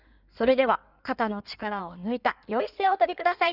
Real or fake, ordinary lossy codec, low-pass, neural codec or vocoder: fake; none; 5.4 kHz; codec, 16 kHz in and 24 kHz out, 1.1 kbps, FireRedTTS-2 codec